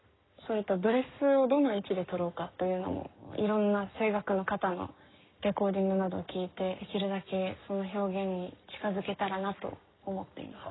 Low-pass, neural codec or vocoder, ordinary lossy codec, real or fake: 7.2 kHz; codec, 44.1 kHz, 7.8 kbps, Pupu-Codec; AAC, 16 kbps; fake